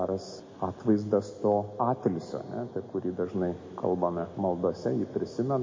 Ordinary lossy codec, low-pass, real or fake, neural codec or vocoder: MP3, 32 kbps; 7.2 kHz; real; none